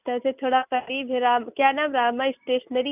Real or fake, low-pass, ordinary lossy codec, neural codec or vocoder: real; 3.6 kHz; none; none